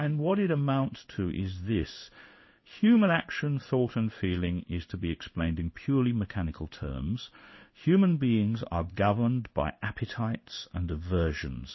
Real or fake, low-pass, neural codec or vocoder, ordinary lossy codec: fake; 7.2 kHz; codec, 16 kHz in and 24 kHz out, 1 kbps, XY-Tokenizer; MP3, 24 kbps